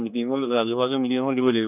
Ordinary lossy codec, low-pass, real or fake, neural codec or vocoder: none; 3.6 kHz; fake; codec, 16 kHz, 2 kbps, X-Codec, HuBERT features, trained on general audio